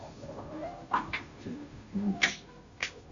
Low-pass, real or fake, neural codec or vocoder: 7.2 kHz; fake; codec, 16 kHz, 0.5 kbps, FunCodec, trained on Chinese and English, 25 frames a second